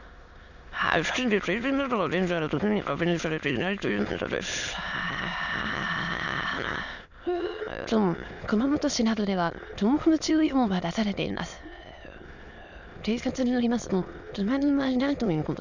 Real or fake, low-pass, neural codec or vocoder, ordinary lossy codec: fake; 7.2 kHz; autoencoder, 22.05 kHz, a latent of 192 numbers a frame, VITS, trained on many speakers; none